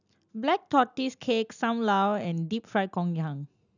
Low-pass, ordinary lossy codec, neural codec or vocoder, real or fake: 7.2 kHz; none; none; real